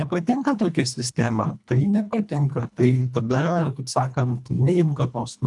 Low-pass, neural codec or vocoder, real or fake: 10.8 kHz; codec, 24 kHz, 1.5 kbps, HILCodec; fake